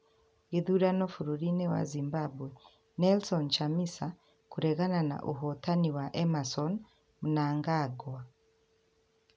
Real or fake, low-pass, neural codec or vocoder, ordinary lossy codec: real; none; none; none